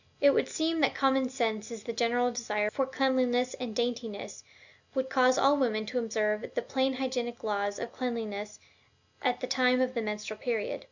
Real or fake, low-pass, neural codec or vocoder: real; 7.2 kHz; none